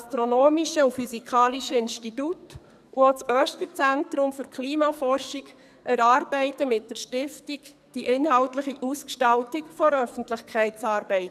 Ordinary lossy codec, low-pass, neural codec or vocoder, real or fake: none; 14.4 kHz; codec, 44.1 kHz, 2.6 kbps, SNAC; fake